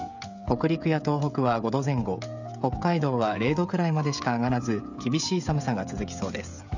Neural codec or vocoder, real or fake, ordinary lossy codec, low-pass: codec, 16 kHz, 16 kbps, FreqCodec, smaller model; fake; none; 7.2 kHz